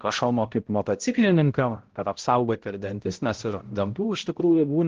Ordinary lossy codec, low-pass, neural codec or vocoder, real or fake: Opus, 16 kbps; 7.2 kHz; codec, 16 kHz, 0.5 kbps, X-Codec, HuBERT features, trained on balanced general audio; fake